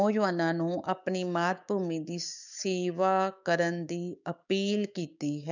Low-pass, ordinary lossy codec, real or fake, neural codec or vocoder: 7.2 kHz; none; fake; codec, 16 kHz, 6 kbps, DAC